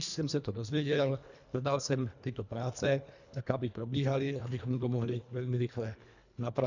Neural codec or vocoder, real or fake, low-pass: codec, 24 kHz, 1.5 kbps, HILCodec; fake; 7.2 kHz